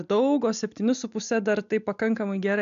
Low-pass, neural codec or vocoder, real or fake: 7.2 kHz; none; real